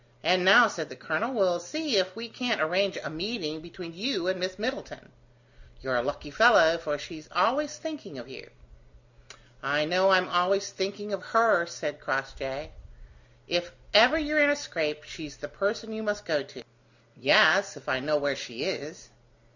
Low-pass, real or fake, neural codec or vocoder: 7.2 kHz; real; none